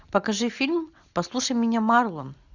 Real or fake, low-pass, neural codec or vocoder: real; 7.2 kHz; none